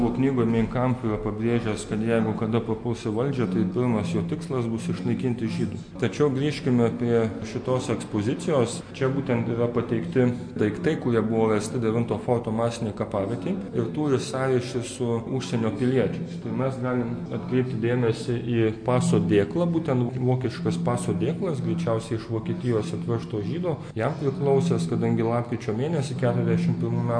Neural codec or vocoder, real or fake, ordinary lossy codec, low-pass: none; real; MP3, 48 kbps; 9.9 kHz